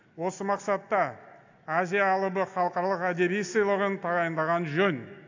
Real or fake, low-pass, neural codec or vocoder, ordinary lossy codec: real; 7.2 kHz; none; AAC, 48 kbps